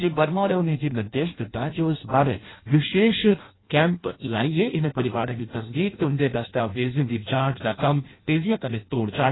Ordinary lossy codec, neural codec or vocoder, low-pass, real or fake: AAC, 16 kbps; codec, 16 kHz in and 24 kHz out, 0.6 kbps, FireRedTTS-2 codec; 7.2 kHz; fake